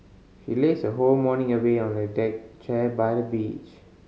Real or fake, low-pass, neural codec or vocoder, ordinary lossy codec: real; none; none; none